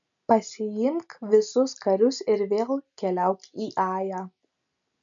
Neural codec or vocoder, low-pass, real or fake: none; 7.2 kHz; real